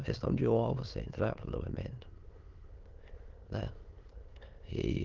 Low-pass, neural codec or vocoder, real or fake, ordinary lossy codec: 7.2 kHz; autoencoder, 22.05 kHz, a latent of 192 numbers a frame, VITS, trained on many speakers; fake; Opus, 16 kbps